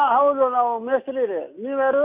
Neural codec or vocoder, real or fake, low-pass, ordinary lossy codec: none; real; 3.6 kHz; MP3, 32 kbps